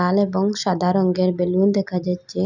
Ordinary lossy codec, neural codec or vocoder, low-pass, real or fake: none; none; 7.2 kHz; real